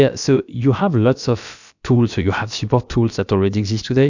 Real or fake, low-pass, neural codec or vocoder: fake; 7.2 kHz; codec, 16 kHz, about 1 kbps, DyCAST, with the encoder's durations